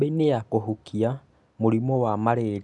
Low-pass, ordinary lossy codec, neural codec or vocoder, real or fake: 10.8 kHz; none; none; real